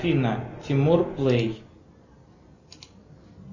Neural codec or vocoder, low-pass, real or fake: none; 7.2 kHz; real